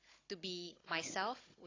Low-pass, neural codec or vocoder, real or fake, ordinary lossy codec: 7.2 kHz; codec, 16 kHz, 16 kbps, FunCodec, trained on Chinese and English, 50 frames a second; fake; AAC, 32 kbps